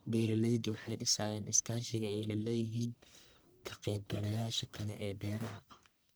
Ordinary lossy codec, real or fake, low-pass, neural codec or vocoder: none; fake; none; codec, 44.1 kHz, 1.7 kbps, Pupu-Codec